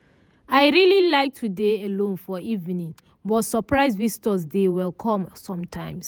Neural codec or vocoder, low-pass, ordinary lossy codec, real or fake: vocoder, 48 kHz, 128 mel bands, Vocos; none; none; fake